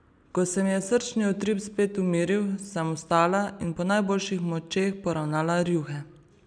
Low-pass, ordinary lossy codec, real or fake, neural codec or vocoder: 9.9 kHz; none; real; none